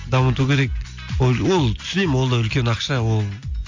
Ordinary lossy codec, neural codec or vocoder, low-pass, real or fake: MP3, 48 kbps; none; 7.2 kHz; real